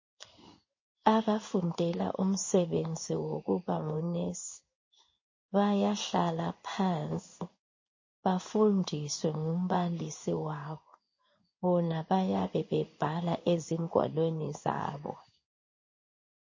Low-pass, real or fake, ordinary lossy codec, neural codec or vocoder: 7.2 kHz; fake; MP3, 32 kbps; codec, 16 kHz in and 24 kHz out, 1 kbps, XY-Tokenizer